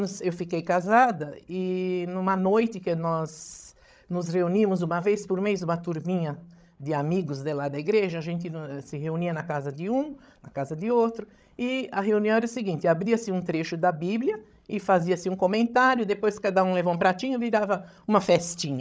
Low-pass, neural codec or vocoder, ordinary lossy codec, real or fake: none; codec, 16 kHz, 16 kbps, FreqCodec, larger model; none; fake